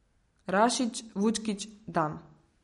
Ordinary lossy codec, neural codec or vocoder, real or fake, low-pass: MP3, 48 kbps; none; real; 19.8 kHz